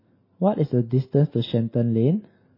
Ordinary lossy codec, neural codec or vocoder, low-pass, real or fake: MP3, 24 kbps; none; 5.4 kHz; real